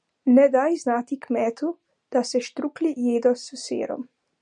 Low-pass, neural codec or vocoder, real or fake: 9.9 kHz; none; real